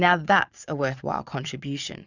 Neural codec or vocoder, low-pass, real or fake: vocoder, 22.05 kHz, 80 mel bands, Vocos; 7.2 kHz; fake